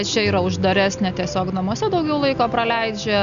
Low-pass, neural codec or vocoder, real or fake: 7.2 kHz; none; real